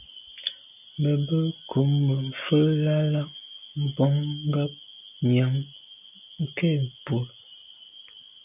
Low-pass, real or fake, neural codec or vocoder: 3.6 kHz; real; none